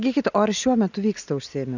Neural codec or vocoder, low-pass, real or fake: none; 7.2 kHz; real